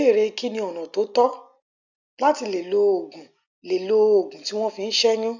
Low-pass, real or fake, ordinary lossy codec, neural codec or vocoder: 7.2 kHz; real; none; none